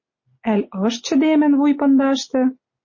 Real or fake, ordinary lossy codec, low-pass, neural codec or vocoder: real; MP3, 32 kbps; 7.2 kHz; none